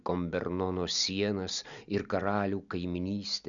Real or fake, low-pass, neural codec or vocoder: real; 7.2 kHz; none